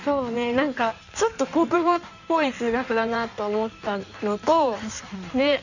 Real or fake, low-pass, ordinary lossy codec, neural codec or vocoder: fake; 7.2 kHz; none; codec, 16 kHz in and 24 kHz out, 1.1 kbps, FireRedTTS-2 codec